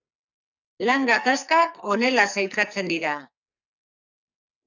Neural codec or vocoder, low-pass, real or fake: codec, 44.1 kHz, 2.6 kbps, SNAC; 7.2 kHz; fake